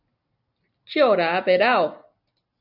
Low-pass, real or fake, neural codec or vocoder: 5.4 kHz; real; none